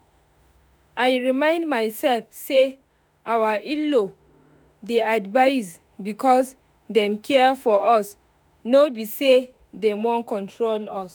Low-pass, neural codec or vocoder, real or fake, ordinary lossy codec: none; autoencoder, 48 kHz, 32 numbers a frame, DAC-VAE, trained on Japanese speech; fake; none